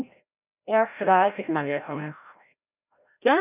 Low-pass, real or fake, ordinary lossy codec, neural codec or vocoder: 3.6 kHz; fake; none; codec, 16 kHz, 0.5 kbps, FreqCodec, larger model